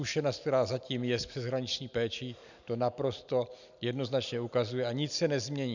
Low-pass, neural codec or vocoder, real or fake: 7.2 kHz; none; real